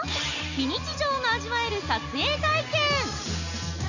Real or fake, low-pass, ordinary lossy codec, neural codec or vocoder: real; 7.2 kHz; none; none